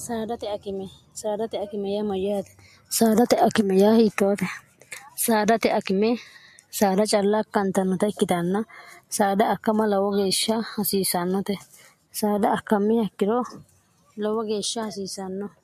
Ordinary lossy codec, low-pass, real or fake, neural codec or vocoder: MP3, 64 kbps; 14.4 kHz; real; none